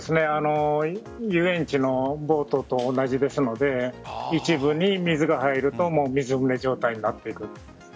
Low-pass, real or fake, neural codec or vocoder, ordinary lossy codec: none; real; none; none